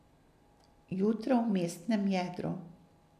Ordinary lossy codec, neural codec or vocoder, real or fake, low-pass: none; none; real; 14.4 kHz